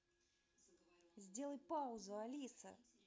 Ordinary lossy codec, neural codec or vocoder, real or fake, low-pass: none; none; real; none